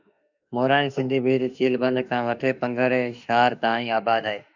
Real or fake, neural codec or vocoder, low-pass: fake; autoencoder, 48 kHz, 32 numbers a frame, DAC-VAE, trained on Japanese speech; 7.2 kHz